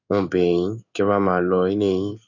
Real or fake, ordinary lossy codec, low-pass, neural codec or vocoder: fake; none; 7.2 kHz; codec, 16 kHz in and 24 kHz out, 1 kbps, XY-Tokenizer